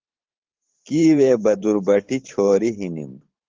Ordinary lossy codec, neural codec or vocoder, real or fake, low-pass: Opus, 16 kbps; vocoder, 44.1 kHz, 128 mel bands every 512 samples, BigVGAN v2; fake; 7.2 kHz